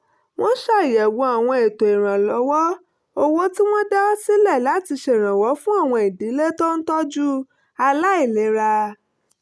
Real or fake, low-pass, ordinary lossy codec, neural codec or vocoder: real; none; none; none